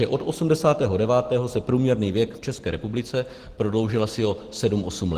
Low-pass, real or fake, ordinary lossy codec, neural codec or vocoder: 14.4 kHz; real; Opus, 16 kbps; none